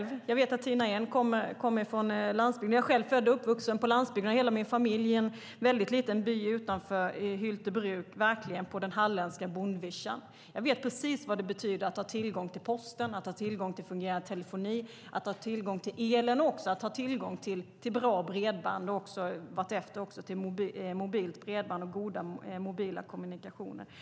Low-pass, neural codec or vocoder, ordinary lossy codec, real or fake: none; none; none; real